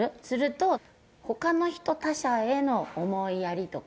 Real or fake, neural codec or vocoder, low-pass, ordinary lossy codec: real; none; none; none